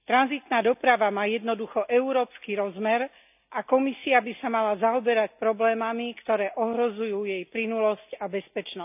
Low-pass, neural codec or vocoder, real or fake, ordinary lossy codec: 3.6 kHz; none; real; none